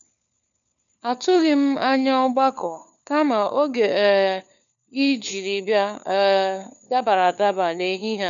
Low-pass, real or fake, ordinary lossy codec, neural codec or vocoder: 7.2 kHz; fake; none; codec, 16 kHz, 4 kbps, FunCodec, trained on LibriTTS, 50 frames a second